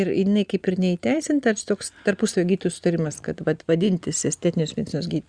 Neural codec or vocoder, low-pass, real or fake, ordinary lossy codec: none; 9.9 kHz; real; Opus, 64 kbps